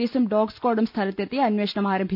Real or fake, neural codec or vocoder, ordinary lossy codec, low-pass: real; none; none; 5.4 kHz